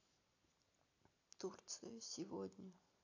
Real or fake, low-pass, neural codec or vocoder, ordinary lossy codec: real; 7.2 kHz; none; AAC, 48 kbps